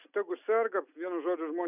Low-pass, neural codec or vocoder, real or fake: 3.6 kHz; none; real